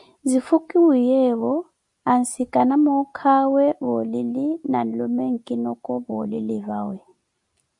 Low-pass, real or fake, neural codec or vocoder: 10.8 kHz; real; none